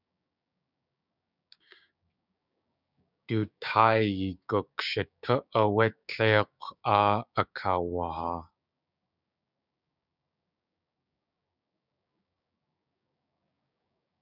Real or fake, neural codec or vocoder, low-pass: fake; codec, 16 kHz, 6 kbps, DAC; 5.4 kHz